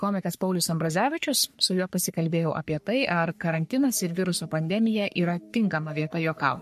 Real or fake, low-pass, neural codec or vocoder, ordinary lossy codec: fake; 14.4 kHz; codec, 44.1 kHz, 3.4 kbps, Pupu-Codec; MP3, 64 kbps